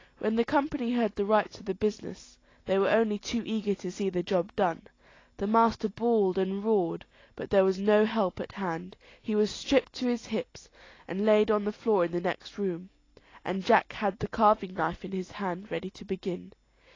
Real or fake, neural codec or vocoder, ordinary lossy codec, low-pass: real; none; AAC, 32 kbps; 7.2 kHz